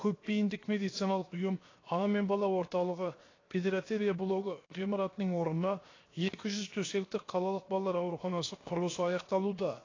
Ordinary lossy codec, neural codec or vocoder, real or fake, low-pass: AAC, 32 kbps; codec, 16 kHz, 0.7 kbps, FocalCodec; fake; 7.2 kHz